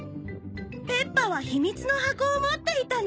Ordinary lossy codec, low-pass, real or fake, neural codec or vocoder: none; none; real; none